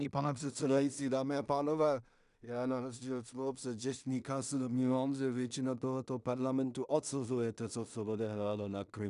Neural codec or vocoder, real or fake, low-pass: codec, 16 kHz in and 24 kHz out, 0.4 kbps, LongCat-Audio-Codec, two codebook decoder; fake; 10.8 kHz